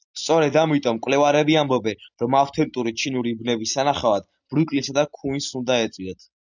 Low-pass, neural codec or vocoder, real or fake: 7.2 kHz; none; real